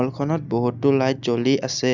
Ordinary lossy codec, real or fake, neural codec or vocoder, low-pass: none; real; none; 7.2 kHz